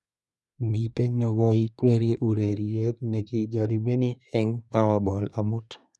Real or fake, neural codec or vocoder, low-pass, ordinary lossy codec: fake; codec, 24 kHz, 1 kbps, SNAC; none; none